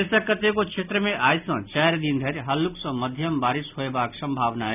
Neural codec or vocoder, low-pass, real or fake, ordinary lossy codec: none; 3.6 kHz; real; none